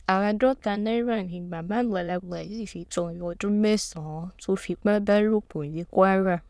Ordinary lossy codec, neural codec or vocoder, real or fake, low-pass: none; autoencoder, 22.05 kHz, a latent of 192 numbers a frame, VITS, trained on many speakers; fake; none